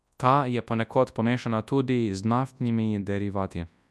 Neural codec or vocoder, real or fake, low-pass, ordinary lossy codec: codec, 24 kHz, 0.9 kbps, WavTokenizer, large speech release; fake; none; none